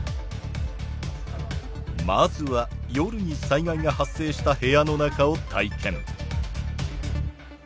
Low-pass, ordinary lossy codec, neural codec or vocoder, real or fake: none; none; none; real